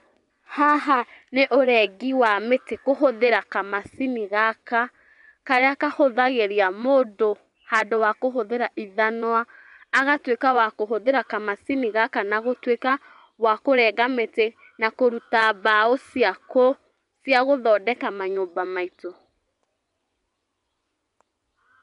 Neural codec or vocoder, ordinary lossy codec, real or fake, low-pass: vocoder, 24 kHz, 100 mel bands, Vocos; none; fake; 10.8 kHz